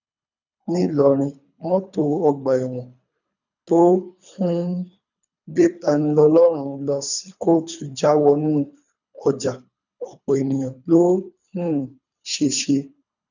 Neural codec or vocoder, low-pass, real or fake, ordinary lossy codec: codec, 24 kHz, 3 kbps, HILCodec; 7.2 kHz; fake; none